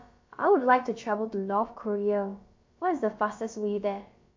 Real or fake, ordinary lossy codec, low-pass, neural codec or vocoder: fake; MP3, 48 kbps; 7.2 kHz; codec, 16 kHz, about 1 kbps, DyCAST, with the encoder's durations